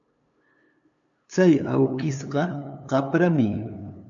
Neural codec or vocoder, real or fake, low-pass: codec, 16 kHz, 2 kbps, FunCodec, trained on LibriTTS, 25 frames a second; fake; 7.2 kHz